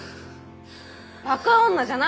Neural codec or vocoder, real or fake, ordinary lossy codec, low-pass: none; real; none; none